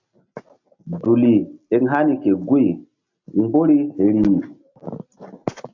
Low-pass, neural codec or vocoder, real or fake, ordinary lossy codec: 7.2 kHz; none; real; AAC, 48 kbps